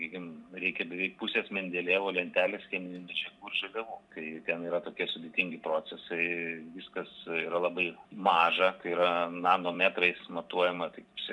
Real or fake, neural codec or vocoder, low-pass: fake; vocoder, 44.1 kHz, 128 mel bands every 256 samples, BigVGAN v2; 10.8 kHz